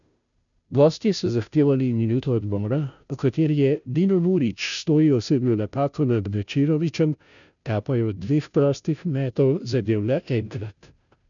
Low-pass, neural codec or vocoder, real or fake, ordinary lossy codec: 7.2 kHz; codec, 16 kHz, 0.5 kbps, FunCodec, trained on Chinese and English, 25 frames a second; fake; none